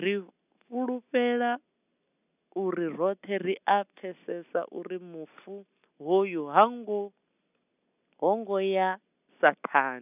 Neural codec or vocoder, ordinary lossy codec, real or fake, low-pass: none; none; real; 3.6 kHz